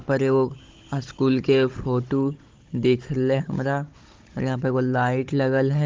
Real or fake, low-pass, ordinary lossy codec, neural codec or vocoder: fake; 7.2 kHz; Opus, 16 kbps; codec, 16 kHz, 16 kbps, FunCodec, trained on Chinese and English, 50 frames a second